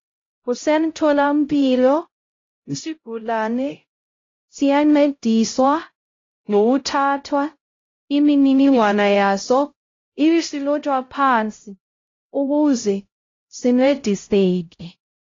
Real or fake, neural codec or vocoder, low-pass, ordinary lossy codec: fake; codec, 16 kHz, 0.5 kbps, X-Codec, HuBERT features, trained on LibriSpeech; 7.2 kHz; AAC, 32 kbps